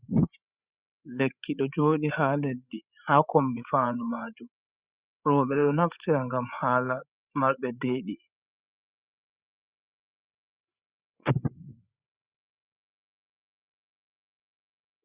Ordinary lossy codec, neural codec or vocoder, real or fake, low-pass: Opus, 64 kbps; codec, 16 kHz, 8 kbps, FreqCodec, larger model; fake; 3.6 kHz